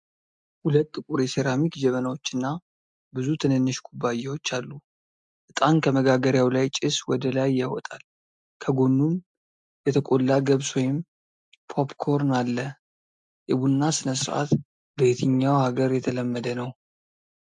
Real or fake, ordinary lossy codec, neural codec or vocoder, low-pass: real; MP3, 64 kbps; none; 9.9 kHz